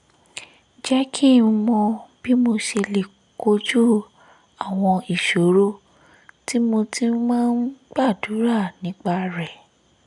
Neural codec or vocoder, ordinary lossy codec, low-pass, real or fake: none; none; 10.8 kHz; real